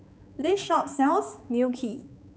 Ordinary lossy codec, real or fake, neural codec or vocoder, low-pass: none; fake; codec, 16 kHz, 4 kbps, X-Codec, HuBERT features, trained on balanced general audio; none